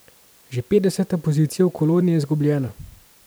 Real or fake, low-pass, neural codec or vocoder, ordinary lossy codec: fake; none; vocoder, 44.1 kHz, 128 mel bands every 256 samples, BigVGAN v2; none